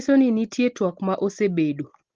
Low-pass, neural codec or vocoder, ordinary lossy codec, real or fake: 7.2 kHz; none; Opus, 16 kbps; real